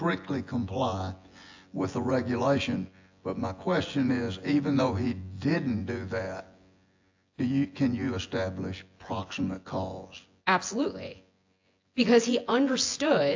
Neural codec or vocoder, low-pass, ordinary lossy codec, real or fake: vocoder, 24 kHz, 100 mel bands, Vocos; 7.2 kHz; AAC, 48 kbps; fake